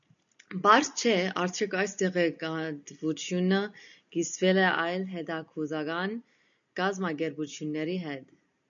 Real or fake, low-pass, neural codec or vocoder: real; 7.2 kHz; none